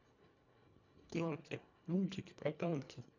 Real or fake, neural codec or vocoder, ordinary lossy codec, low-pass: fake; codec, 24 kHz, 1.5 kbps, HILCodec; none; 7.2 kHz